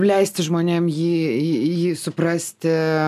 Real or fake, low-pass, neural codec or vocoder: real; 14.4 kHz; none